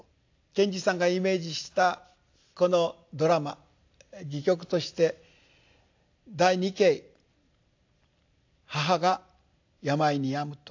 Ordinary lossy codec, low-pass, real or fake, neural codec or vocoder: AAC, 48 kbps; 7.2 kHz; real; none